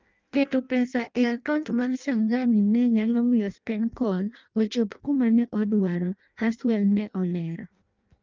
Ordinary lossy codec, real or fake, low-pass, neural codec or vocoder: Opus, 24 kbps; fake; 7.2 kHz; codec, 16 kHz in and 24 kHz out, 0.6 kbps, FireRedTTS-2 codec